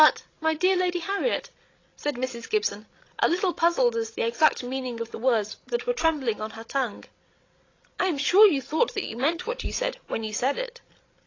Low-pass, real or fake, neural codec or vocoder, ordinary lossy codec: 7.2 kHz; fake; codec, 16 kHz, 16 kbps, FreqCodec, larger model; AAC, 32 kbps